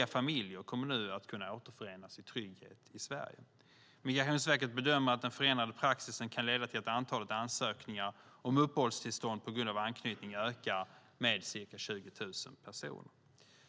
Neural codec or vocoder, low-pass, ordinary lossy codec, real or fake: none; none; none; real